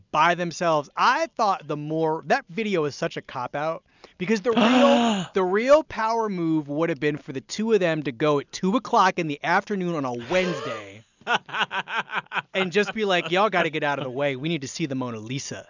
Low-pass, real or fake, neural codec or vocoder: 7.2 kHz; real; none